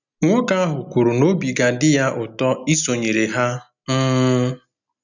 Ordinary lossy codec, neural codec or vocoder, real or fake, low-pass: none; none; real; 7.2 kHz